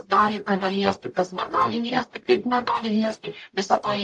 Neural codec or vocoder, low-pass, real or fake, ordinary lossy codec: codec, 44.1 kHz, 0.9 kbps, DAC; 10.8 kHz; fake; AAC, 64 kbps